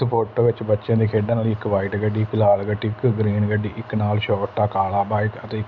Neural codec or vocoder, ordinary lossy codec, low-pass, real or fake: none; none; 7.2 kHz; real